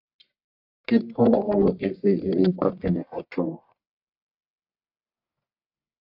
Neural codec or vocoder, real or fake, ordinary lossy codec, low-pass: codec, 44.1 kHz, 1.7 kbps, Pupu-Codec; fake; AAC, 48 kbps; 5.4 kHz